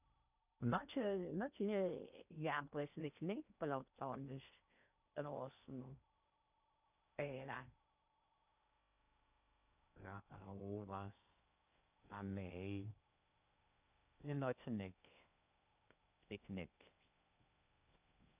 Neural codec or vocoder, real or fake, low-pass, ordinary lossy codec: codec, 16 kHz in and 24 kHz out, 0.8 kbps, FocalCodec, streaming, 65536 codes; fake; 3.6 kHz; none